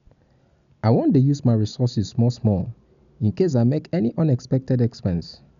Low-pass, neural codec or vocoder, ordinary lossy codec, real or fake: 7.2 kHz; none; none; real